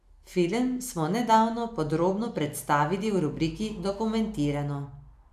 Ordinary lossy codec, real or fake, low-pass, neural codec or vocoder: none; real; 14.4 kHz; none